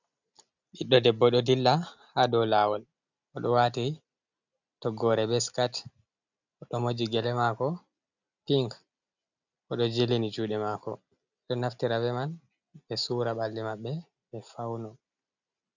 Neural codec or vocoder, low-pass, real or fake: none; 7.2 kHz; real